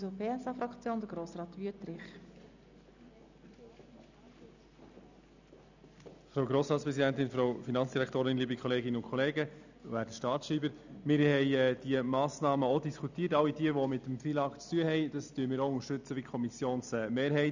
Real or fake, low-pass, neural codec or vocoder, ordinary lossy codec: real; 7.2 kHz; none; none